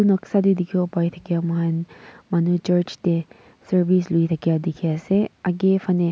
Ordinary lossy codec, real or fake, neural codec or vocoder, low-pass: none; real; none; none